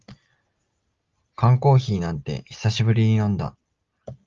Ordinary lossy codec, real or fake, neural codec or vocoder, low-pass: Opus, 32 kbps; real; none; 7.2 kHz